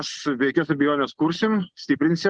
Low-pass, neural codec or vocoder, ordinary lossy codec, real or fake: 9.9 kHz; none; Opus, 16 kbps; real